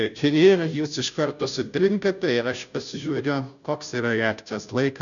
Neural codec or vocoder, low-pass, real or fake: codec, 16 kHz, 0.5 kbps, FunCodec, trained on Chinese and English, 25 frames a second; 7.2 kHz; fake